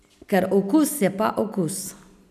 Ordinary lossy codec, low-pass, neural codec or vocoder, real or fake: none; 14.4 kHz; none; real